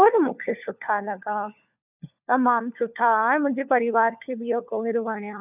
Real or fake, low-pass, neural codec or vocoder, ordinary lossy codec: fake; 3.6 kHz; codec, 16 kHz, 4 kbps, FunCodec, trained on LibriTTS, 50 frames a second; none